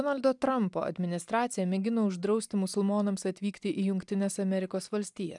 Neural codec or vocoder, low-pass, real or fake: none; 10.8 kHz; real